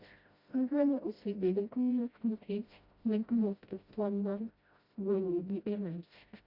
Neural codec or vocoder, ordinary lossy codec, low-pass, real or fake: codec, 16 kHz, 0.5 kbps, FreqCodec, smaller model; Opus, 64 kbps; 5.4 kHz; fake